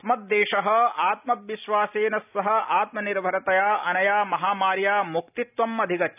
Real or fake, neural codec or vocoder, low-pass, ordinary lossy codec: real; none; 3.6 kHz; none